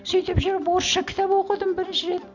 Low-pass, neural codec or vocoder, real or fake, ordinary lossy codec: 7.2 kHz; none; real; none